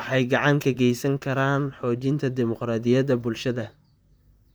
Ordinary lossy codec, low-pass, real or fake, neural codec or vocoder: none; none; fake; vocoder, 44.1 kHz, 128 mel bands, Pupu-Vocoder